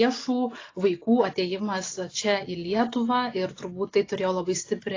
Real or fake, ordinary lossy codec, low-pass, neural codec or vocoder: real; AAC, 32 kbps; 7.2 kHz; none